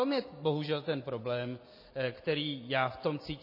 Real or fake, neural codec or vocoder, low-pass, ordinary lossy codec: real; none; 5.4 kHz; MP3, 24 kbps